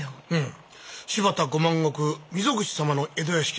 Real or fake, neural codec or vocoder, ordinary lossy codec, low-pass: real; none; none; none